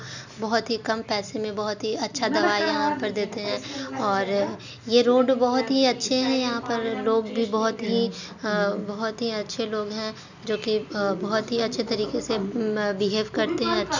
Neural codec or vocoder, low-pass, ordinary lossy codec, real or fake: none; 7.2 kHz; none; real